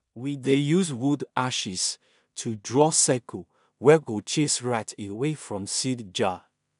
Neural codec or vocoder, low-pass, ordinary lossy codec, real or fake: codec, 16 kHz in and 24 kHz out, 0.4 kbps, LongCat-Audio-Codec, two codebook decoder; 10.8 kHz; none; fake